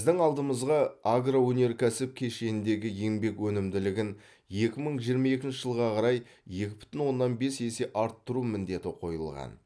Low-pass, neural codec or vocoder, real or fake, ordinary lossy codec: none; none; real; none